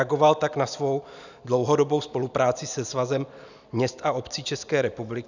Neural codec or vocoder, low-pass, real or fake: none; 7.2 kHz; real